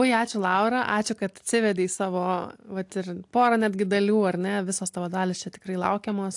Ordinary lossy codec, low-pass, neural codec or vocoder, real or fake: AAC, 64 kbps; 10.8 kHz; none; real